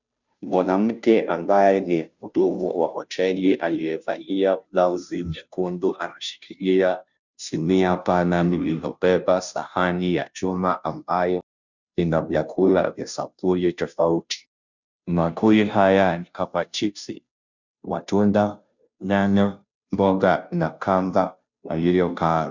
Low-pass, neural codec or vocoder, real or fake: 7.2 kHz; codec, 16 kHz, 0.5 kbps, FunCodec, trained on Chinese and English, 25 frames a second; fake